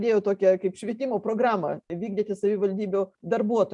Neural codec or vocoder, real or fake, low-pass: none; real; 10.8 kHz